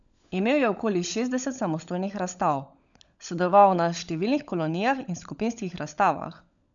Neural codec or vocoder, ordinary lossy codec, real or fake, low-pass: codec, 16 kHz, 16 kbps, FunCodec, trained on LibriTTS, 50 frames a second; none; fake; 7.2 kHz